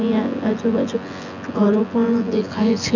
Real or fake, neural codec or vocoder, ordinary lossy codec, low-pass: fake; vocoder, 24 kHz, 100 mel bands, Vocos; none; 7.2 kHz